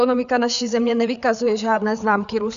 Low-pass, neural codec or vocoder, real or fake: 7.2 kHz; codec, 16 kHz, 4 kbps, FreqCodec, larger model; fake